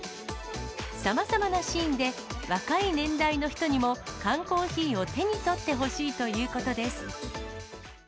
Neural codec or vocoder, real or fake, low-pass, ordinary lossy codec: none; real; none; none